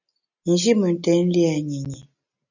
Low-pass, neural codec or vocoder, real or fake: 7.2 kHz; none; real